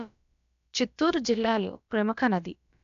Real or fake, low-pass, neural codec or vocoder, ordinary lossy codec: fake; 7.2 kHz; codec, 16 kHz, about 1 kbps, DyCAST, with the encoder's durations; none